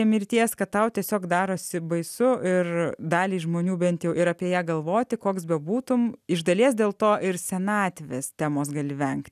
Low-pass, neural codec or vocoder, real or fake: 14.4 kHz; none; real